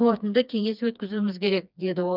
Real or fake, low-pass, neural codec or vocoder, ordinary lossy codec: fake; 5.4 kHz; codec, 16 kHz, 2 kbps, FreqCodec, smaller model; none